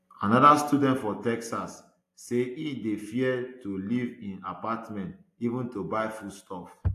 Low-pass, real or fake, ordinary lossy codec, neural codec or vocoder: 14.4 kHz; real; AAC, 64 kbps; none